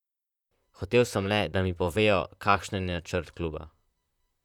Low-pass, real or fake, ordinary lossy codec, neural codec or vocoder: 19.8 kHz; fake; none; vocoder, 44.1 kHz, 128 mel bands, Pupu-Vocoder